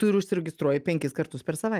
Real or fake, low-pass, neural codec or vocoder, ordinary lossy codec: real; 14.4 kHz; none; Opus, 32 kbps